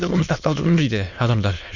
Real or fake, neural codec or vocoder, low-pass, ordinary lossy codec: fake; autoencoder, 22.05 kHz, a latent of 192 numbers a frame, VITS, trained on many speakers; 7.2 kHz; none